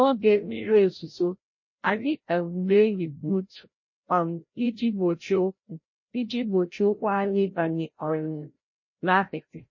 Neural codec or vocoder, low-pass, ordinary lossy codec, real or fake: codec, 16 kHz, 0.5 kbps, FreqCodec, larger model; 7.2 kHz; MP3, 32 kbps; fake